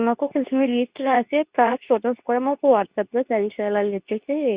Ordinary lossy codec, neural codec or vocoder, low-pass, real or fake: Opus, 64 kbps; codec, 24 kHz, 0.9 kbps, WavTokenizer, medium speech release version 2; 3.6 kHz; fake